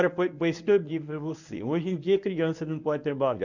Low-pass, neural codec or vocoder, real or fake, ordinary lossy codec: 7.2 kHz; codec, 24 kHz, 0.9 kbps, WavTokenizer, medium speech release version 1; fake; none